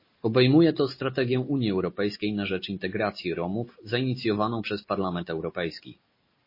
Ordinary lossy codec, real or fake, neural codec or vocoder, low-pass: MP3, 24 kbps; real; none; 5.4 kHz